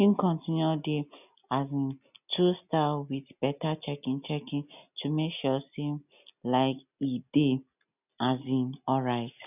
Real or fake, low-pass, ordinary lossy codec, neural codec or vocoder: real; 3.6 kHz; none; none